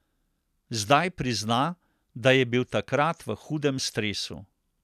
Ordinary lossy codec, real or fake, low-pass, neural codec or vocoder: none; real; 14.4 kHz; none